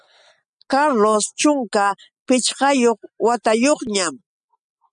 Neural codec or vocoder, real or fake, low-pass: none; real; 9.9 kHz